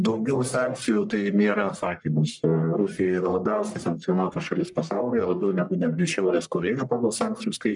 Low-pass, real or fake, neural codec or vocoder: 10.8 kHz; fake; codec, 44.1 kHz, 1.7 kbps, Pupu-Codec